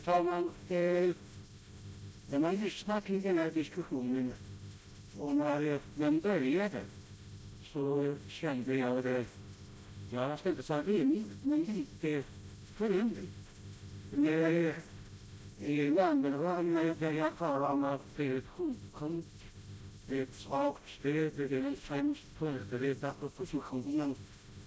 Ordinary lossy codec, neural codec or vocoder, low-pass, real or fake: none; codec, 16 kHz, 0.5 kbps, FreqCodec, smaller model; none; fake